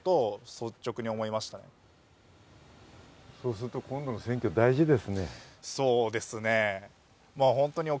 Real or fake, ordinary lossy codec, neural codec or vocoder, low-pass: real; none; none; none